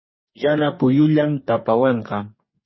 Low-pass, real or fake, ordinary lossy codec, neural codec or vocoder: 7.2 kHz; fake; MP3, 24 kbps; codec, 44.1 kHz, 2.6 kbps, DAC